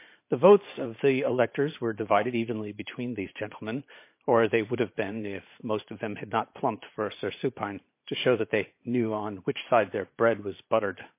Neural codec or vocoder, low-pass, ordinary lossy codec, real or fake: vocoder, 44.1 kHz, 128 mel bands every 512 samples, BigVGAN v2; 3.6 kHz; MP3, 24 kbps; fake